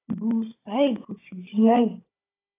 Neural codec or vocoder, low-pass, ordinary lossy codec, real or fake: codec, 16 kHz, 16 kbps, FunCodec, trained on Chinese and English, 50 frames a second; 3.6 kHz; AAC, 16 kbps; fake